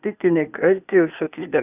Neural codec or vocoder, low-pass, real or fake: codec, 16 kHz, 0.8 kbps, ZipCodec; 3.6 kHz; fake